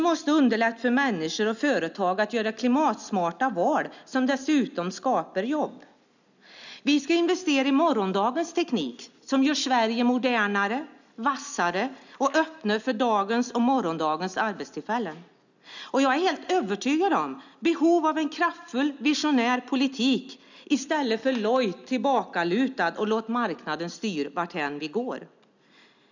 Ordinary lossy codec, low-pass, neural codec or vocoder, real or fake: none; 7.2 kHz; none; real